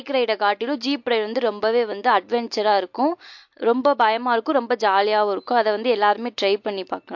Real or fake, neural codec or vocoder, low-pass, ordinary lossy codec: real; none; 7.2 kHz; MP3, 48 kbps